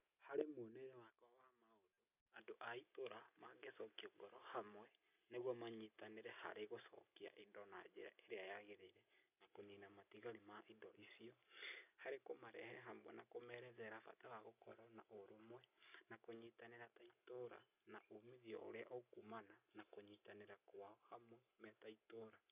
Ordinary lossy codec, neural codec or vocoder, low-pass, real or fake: none; none; 3.6 kHz; real